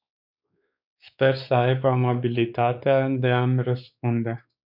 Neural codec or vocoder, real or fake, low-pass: codec, 16 kHz, 4 kbps, X-Codec, WavLM features, trained on Multilingual LibriSpeech; fake; 5.4 kHz